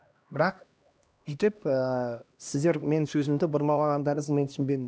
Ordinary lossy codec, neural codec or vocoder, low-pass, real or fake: none; codec, 16 kHz, 1 kbps, X-Codec, HuBERT features, trained on LibriSpeech; none; fake